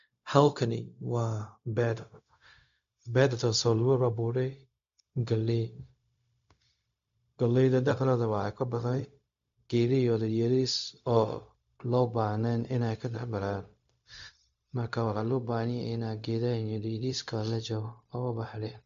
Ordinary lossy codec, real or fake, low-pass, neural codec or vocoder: AAC, 64 kbps; fake; 7.2 kHz; codec, 16 kHz, 0.4 kbps, LongCat-Audio-Codec